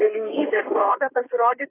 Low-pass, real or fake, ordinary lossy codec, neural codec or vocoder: 3.6 kHz; fake; AAC, 16 kbps; codec, 32 kHz, 1.9 kbps, SNAC